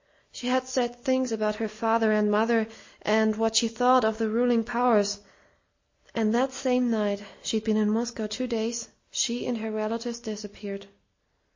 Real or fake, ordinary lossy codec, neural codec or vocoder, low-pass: real; MP3, 32 kbps; none; 7.2 kHz